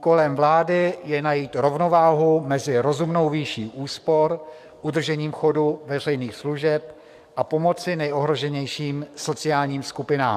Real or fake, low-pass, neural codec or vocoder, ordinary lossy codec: fake; 14.4 kHz; codec, 44.1 kHz, 7.8 kbps, DAC; MP3, 96 kbps